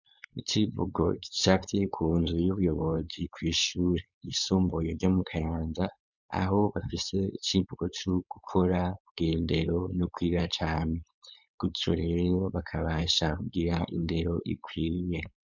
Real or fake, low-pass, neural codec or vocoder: fake; 7.2 kHz; codec, 16 kHz, 4.8 kbps, FACodec